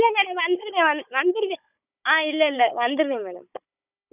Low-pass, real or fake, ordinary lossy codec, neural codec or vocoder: 3.6 kHz; fake; none; codec, 16 kHz, 16 kbps, FunCodec, trained on Chinese and English, 50 frames a second